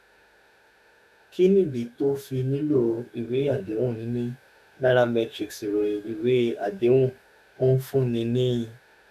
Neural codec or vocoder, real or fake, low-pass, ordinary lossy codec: autoencoder, 48 kHz, 32 numbers a frame, DAC-VAE, trained on Japanese speech; fake; 14.4 kHz; none